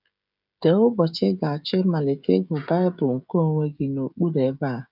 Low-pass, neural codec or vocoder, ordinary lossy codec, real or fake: 5.4 kHz; codec, 16 kHz, 16 kbps, FreqCodec, smaller model; none; fake